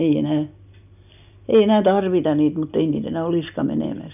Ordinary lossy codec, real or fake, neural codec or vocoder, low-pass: none; real; none; 3.6 kHz